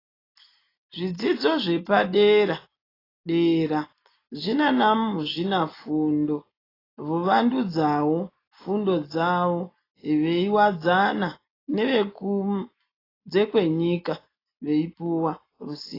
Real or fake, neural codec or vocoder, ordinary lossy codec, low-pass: real; none; AAC, 24 kbps; 5.4 kHz